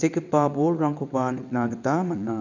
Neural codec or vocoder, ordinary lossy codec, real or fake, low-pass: vocoder, 22.05 kHz, 80 mel bands, WaveNeXt; none; fake; 7.2 kHz